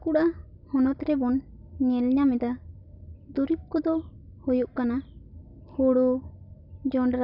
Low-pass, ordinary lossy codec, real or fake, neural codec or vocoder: 5.4 kHz; none; real; none